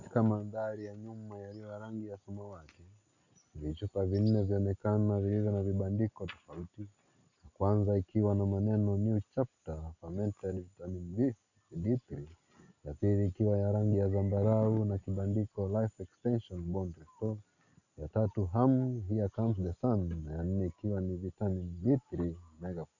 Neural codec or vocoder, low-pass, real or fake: none; 7.2 kHz; real